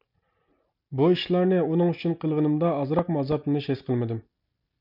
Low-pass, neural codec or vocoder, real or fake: 5.4 kHz; none; real